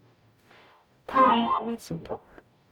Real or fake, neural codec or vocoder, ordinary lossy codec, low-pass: fake; codec, 44.1 kHz, 0.9 kbps, DAC; none; none